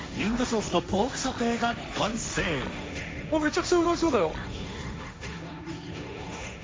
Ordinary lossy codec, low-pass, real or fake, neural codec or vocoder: none; none; fake; codec, 16 kHz, 1.1 kbps, Voila-Tokenizer